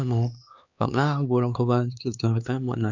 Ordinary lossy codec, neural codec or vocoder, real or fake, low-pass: none; codec, 16 kHz, 2 kbps, X-Codec, HuBERT features, trained on LibriSpeech; fake; 7.2 kHz